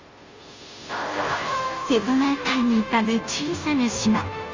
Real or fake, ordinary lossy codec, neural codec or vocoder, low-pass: fake; Opus, 32 kbps; codec, 16 kHz, 0.5 kbps, FunCodec, trained on Chinese and English, 25 frames a second; 7.2 kHz